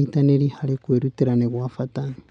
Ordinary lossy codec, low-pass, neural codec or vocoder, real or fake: none; 9.9 kHz; none; real